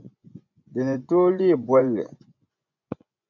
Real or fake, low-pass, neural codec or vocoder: fake; 7.2 kHz; vocoder, 24 kHz, 100 mel bands, Vocos